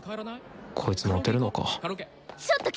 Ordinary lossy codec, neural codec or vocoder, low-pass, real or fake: none; none; none; real